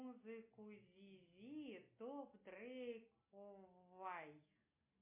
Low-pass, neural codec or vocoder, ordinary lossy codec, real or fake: 3.6 kHz; none; MP3, 24 kbps; real